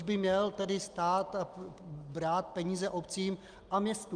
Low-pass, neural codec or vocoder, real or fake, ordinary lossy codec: 9.9 kHz; none; real; Opus, 32 kbps